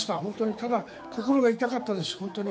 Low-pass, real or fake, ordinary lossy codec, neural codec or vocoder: none; fake; none; codec, 16 kHz, 4 kbps, X-Codec, HuBERT features, trained on general audio